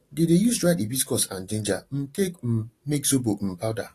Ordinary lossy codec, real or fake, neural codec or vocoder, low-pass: AAC, 48 kbps; real; none; 14.4 kHz